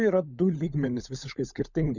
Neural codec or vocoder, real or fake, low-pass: codec, 16 kHz, 16 kbps, FunCodec, trained on LibriTTS, 50 frames a second; fake; 7.2 kHz